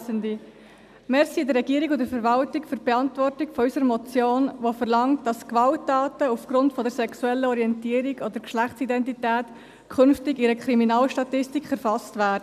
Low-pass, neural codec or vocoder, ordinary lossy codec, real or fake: 14.4 kHz; none; none; real